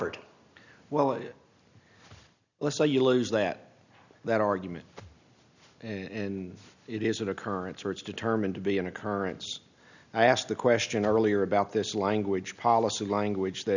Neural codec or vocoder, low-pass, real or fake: none; 7.2 kHz; real